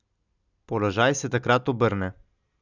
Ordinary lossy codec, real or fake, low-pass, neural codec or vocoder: none; real; 7.2 kHz; none